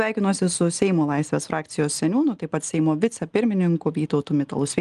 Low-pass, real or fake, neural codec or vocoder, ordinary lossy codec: 10.8 kHz; real; none; Opus, 24 kbps